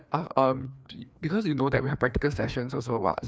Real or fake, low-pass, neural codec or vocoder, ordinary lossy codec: fake; none; codec, 16 kHz, 2 kbps, FreqCodec, larger model; none